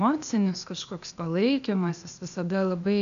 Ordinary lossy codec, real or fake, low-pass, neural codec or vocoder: MP3, 96 kbps; fake; 7.2 kHz; codec, 16 kHz, 0.8 kbps, ZipCodec